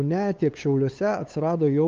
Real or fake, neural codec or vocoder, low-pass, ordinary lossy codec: fake; codec, 16 kHz, 8 kbps, FunCodec, trained on LibriTTS, 25 frames a second; 7.2 kHz; Opus, 32 kbps